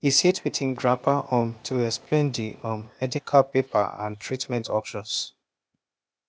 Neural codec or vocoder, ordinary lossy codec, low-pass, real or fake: codec, 16 kHz, 0.8 kbps, ZipCodec; none; none; fake